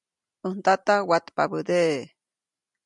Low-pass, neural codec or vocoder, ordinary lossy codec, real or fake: 10.8 kHz; none; MP3, 96 kbps; real